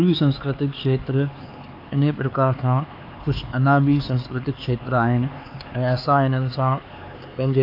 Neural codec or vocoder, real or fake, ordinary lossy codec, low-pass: codec, 16 kHz, 4 kbps, X-Codec, HuBERT features, trained on LibriSpeech; fake; AAC, 32 kbps; 5.4 kHz